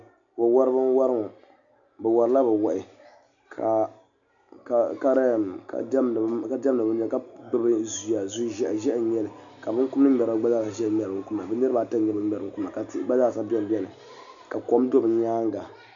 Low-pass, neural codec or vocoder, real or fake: 7.2 kHz; none; real